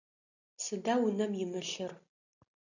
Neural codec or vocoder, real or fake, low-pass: none; real; 7.2 kHz